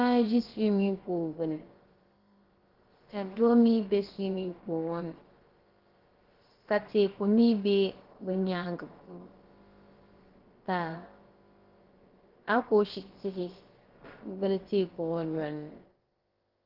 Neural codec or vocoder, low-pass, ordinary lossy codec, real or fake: codec, 16 kHz, about 1 kbps, DyCAST, with the encoder's durations; 5.4 kHz; Opus, 16 kbps; fake